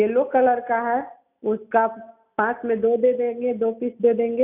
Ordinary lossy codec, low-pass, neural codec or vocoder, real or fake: none; 3.6 kHz; none; real